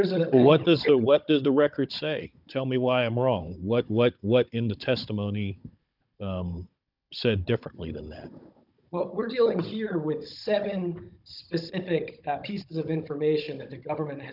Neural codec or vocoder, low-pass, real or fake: codec, 16 kHz, 16 kbps, FunCodec, trained on Chinese and English, 50 frames a second; 5.4 kHz; fake